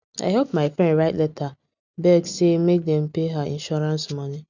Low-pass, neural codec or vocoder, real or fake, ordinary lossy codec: 7.2 kHz; none; real; none